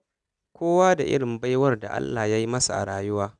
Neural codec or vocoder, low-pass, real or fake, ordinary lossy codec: none; 10.8 kHz; real; none